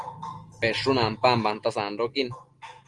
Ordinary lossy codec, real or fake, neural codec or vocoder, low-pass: Opus, 24 kbps; real; none; 10.8 kHz